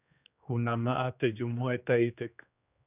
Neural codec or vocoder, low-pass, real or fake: codec, 16 kHz, 2 kbps, X-Codec, HuBERT features, trained on general audio; 3.6 kHz; fake